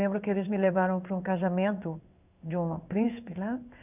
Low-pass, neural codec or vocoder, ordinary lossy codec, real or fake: 3.6 kHz; codec, 16 kHz in and 24 kHz out, 1 kbps, XY-Tokenizer; none; fake